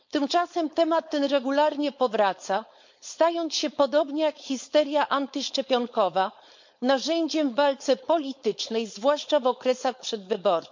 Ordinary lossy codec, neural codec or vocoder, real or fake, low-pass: MP3, 48 kbps; codec, 16 kHz, 4.8 kbps, FACodec; fake; 7.2 kHz